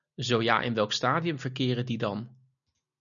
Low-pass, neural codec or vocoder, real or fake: 7.2 kHz; none; real